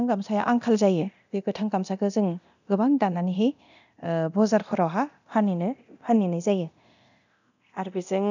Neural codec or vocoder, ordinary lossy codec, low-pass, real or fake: codec, 24 kHz, 0.9 kbps, DualCodec; none; 7.2 kHz; fake